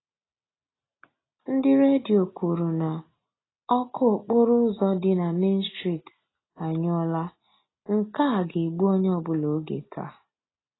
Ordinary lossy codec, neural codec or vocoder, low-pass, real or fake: AAC, 16 kbps; none; 7.2 kHz; real